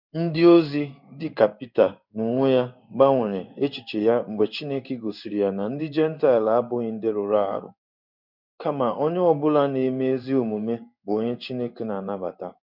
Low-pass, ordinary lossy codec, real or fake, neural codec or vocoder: 5.4 kHz; none; fake; codec, 16 kHz in and 24 kHz out, 1 kbps, XY-Tokenizer